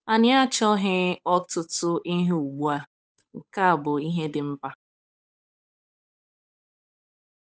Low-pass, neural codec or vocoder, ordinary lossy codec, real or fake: none; codec, 16 kHz, 8 kbps, FunCodec, trained on Chinese and English, 25 frames a second; none; fake